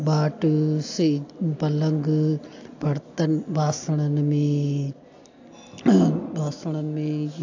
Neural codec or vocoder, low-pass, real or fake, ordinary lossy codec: none; 7.2 kHz; real; AAC, 48 kbps